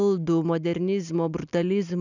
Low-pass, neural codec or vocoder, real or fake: 7.2 kHz; none; real